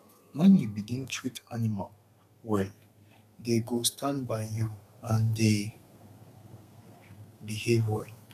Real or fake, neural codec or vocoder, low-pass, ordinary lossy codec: fake; codec, 32 kHz, 1.9 kbps, SNAC; 14.4 kHz; none